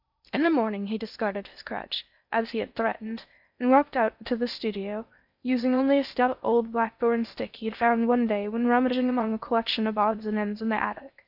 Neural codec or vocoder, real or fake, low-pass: codec, 16 kHz in and 24 kHz out, 0.6 kbps, FocalCodec, streaming, 2048 codes; fake; 5.4 kHz